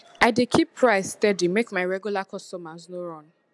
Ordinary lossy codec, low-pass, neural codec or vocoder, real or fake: none; none; none; real